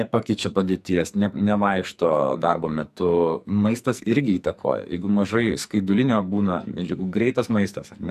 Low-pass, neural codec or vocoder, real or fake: 14.4 kHz; codec, 44.1 kHz, 2.6 kbps, SNAC; fake